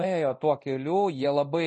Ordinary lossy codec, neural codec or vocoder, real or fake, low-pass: MP3, 32 kbps; codec, 24 kHz, 0.9 kbps, DualCodec; fake; 10.8 kHz